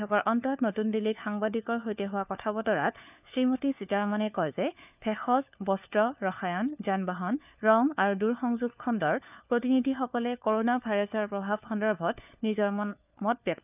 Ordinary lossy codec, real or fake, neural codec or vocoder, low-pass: none; fake; codec, 16 kHz, 4 kbps, FunCodec, trained on LibriTTS, 50 frames a second; 3.6 kHz